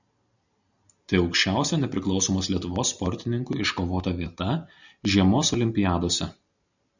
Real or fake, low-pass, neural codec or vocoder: real; 7.2 kHz; none